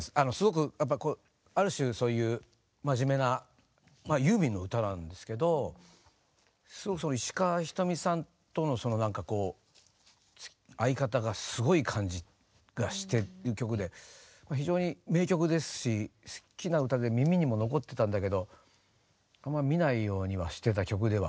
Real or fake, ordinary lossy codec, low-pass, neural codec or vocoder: real; none; none; none